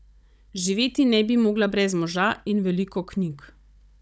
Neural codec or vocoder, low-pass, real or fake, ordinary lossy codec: codec, 16 kHz, 16 kbps, FunCodec, trained on Chinese and English, 50 frames a second; none; fake; none